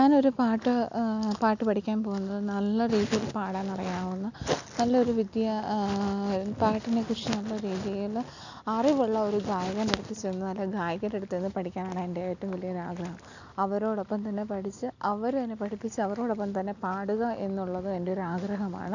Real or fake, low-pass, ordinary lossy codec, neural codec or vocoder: real; 7.2 kHz; none; none